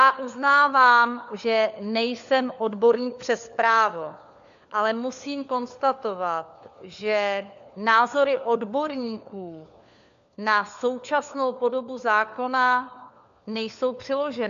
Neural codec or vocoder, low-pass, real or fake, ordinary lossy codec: codec, 16 kHz, 4 kbps, FunCodec, trained on LibriTTS, 50 frames a second; 7.2 kHz; fake; MP3, 64 kbps